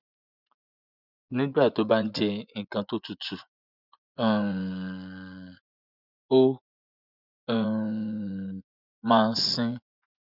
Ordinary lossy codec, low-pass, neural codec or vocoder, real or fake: none; 5.4 kHz; vocoder, 24 kHz, 100 mel bands, Vocos; fake